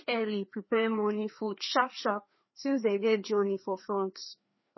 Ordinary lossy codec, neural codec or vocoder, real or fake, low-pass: MP3, 24 kbps; codec, 16 kHz, 2 kbps, FreqCodec, larger model; fake; 7.2 kHz